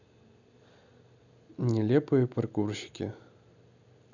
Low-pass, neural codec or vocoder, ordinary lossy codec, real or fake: 7.2 kHz; none; none; real